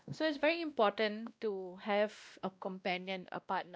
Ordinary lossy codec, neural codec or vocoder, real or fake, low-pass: none; codec, 16 kHz, 1 kbps, X-Codec, WavLM features, trained on Multilingual LibriSpeech; fake; none